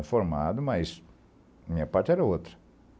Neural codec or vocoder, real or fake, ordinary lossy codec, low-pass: none; real; none; none